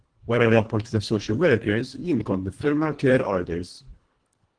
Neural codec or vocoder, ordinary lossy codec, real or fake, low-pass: codec, 24 kHz, 1.5 kbps, HILCodec; Opus, 16 kbps; fake; 9.9 kHz